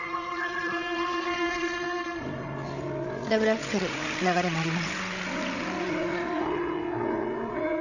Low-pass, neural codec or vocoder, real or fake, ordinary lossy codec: 7.2 kHz; codec, 16 kHz, 8 kbps, FreqCodec, larger model; fake; none